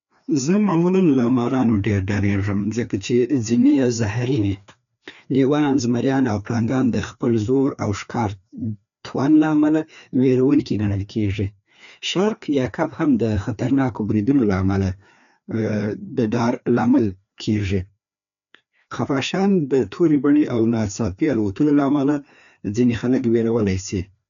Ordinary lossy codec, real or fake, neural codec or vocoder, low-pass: none; fake; codec, 16 kHz, 2 kbps, FreqCodec, larger model; 7.2 kHz